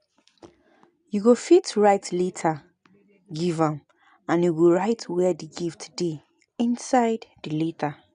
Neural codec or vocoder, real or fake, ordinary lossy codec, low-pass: none; real; none; 9.9 kHz